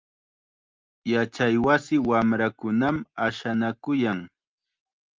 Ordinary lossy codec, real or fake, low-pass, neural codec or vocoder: Opus, 24 kbps; real; 7.2 kHz; none